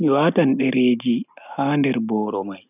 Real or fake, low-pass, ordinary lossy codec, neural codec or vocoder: real; 3.6 kHz; none; none